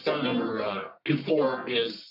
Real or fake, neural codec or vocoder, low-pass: fake; codec, 44.1 kHz, 1.7 kbps, Pupu-Codec; 5.4 kHz